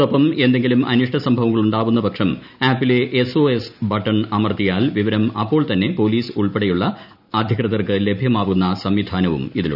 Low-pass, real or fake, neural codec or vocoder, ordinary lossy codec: 5.4 kHz; real; none; none